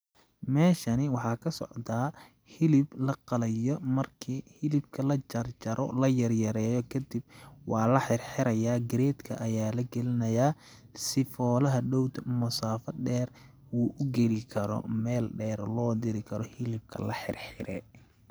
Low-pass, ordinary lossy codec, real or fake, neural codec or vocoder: none; none; real; none